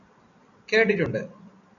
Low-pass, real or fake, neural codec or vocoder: 7.2 kHz; real; none